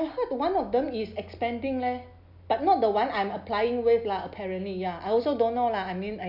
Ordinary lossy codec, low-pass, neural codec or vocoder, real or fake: none; 5.4 kHz; none; real